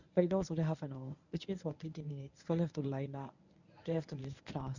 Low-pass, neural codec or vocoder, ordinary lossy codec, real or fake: 7.2 kHz; codec, 24 kHz, 0.9 kbps, WavTokenizer, medium speech release version 1; none; fake